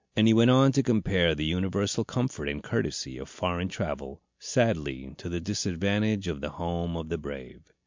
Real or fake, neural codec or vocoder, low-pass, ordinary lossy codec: real; none; 7.2 kHz; MP3, 64 kbps